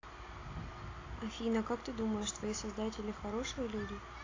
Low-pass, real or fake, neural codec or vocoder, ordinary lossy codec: 7.2 kHz; real; none; AAC, 32 kbps